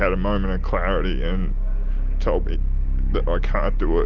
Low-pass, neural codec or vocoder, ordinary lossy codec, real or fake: 7.2 kHz; none; Opus, 24 kbps; real